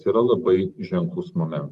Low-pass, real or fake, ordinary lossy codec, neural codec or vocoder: 14.4 kHz; real; Opus, 24 kbps; none